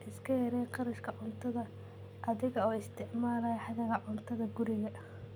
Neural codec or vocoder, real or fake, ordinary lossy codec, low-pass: none; real; none; none